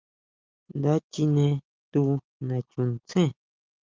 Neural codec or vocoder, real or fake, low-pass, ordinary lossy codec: none; real; 7.2 kHz; Opus, 24 kbps